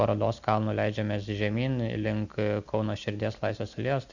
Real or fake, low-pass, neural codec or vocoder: real; 7.2 kHz; none